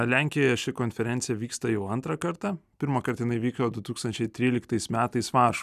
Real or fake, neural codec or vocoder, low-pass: real; none; 14.4 kHz